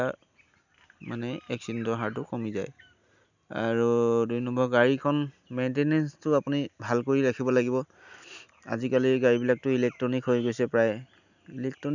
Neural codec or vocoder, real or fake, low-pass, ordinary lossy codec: none; real; 7.2 kHz; none